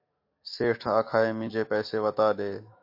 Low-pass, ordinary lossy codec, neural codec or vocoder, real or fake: 5.4 kHz; MP3, 32 kbps; autoencoder, 48 kHz, 128 numbers a frame, DAC-VAE, trained on Japanese speech; fake